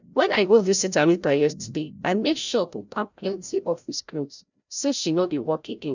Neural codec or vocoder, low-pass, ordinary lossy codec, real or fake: codec, 16 kHz, 0.5 kbps, FreqCodec, larger model; 7.2 kHz; none; fake